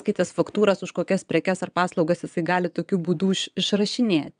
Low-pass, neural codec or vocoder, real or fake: 9.9 kHz; vocoder, 22.05 kHz, 80 mel bands, WaveNeXt; fake